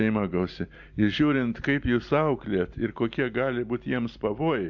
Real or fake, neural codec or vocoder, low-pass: real; none; 7.2 kHz